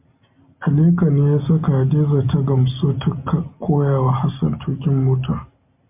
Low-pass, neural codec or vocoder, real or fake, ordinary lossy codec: 3.6 kHz; none; real; MP3, 32 kbps